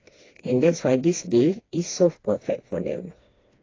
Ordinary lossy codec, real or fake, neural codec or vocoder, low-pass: AAC, 32 kbps; fake; codec, 16 kHz, 2 kbps, FreqCodec, smaller model; 7.2 kHz